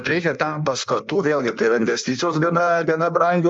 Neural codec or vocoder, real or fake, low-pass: codec, 16 kHz in and 24 kHz out, 1.1 kbps, FireRedTTS-2 codec; fake; 9.9 kHz